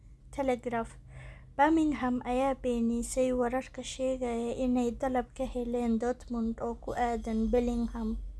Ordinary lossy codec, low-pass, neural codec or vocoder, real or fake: none; none; none; real